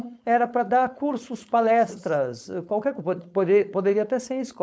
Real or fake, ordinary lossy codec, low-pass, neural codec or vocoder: fake; none; none; codec, 16 kHz, 4.8 kbps, FACodec